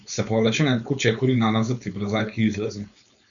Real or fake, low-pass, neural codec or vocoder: fake; 7.2 kHz; codec, 16 kHz, 4.8 kbps, FACodec